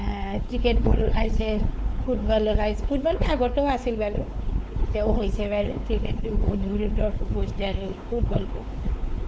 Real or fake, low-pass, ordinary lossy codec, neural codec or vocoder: fake; none; none; codec, 16 kHz, 4 kbps, X-Codec, WavLM features, trained on Multilingual LibriSpeech